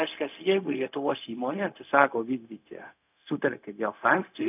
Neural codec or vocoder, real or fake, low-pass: codec, 16 kHz, 0.4 kbps, LongCat-Audio-Codec; fake; 3.6 kHz